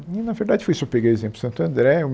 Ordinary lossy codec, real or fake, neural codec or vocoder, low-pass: none; real; none; none